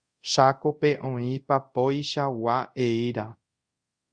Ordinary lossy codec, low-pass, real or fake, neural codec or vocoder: Opus, 64 kbps; 9.9 kHz; fake; codec, 24 kHz, 0.5 kbps, DualCodec